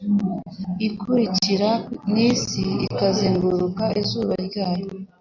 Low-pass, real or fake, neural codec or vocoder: 7.2 kHz; real; none